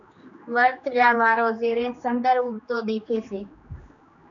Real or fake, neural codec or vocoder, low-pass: fake; codec, 16 kHz, 2 kbps, X-Codec, HuBERT features, trained on general audio; 7.2 kHz